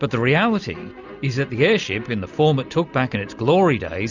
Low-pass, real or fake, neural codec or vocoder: 7.2 kHz; real; none